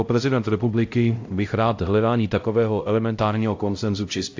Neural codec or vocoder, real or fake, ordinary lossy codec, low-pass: codec, 16 kHz, 0.5 kbps, X-Codec, HuBERT features, trained on LibriSpeech; fake; AAC, 48 kbps; 7.2 kHz